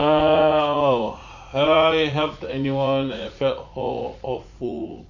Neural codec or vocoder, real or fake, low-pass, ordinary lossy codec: vocoder, 44.1 kHz, 80 mel bands, Vocos; fake; 7.2 kHz; none